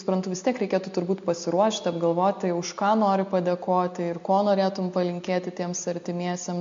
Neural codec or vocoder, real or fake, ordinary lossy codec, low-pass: none; real; MP3, 48 kbps; 7.2 kHz